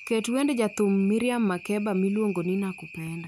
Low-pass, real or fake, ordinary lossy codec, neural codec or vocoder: 19.8 kHz; real; none; none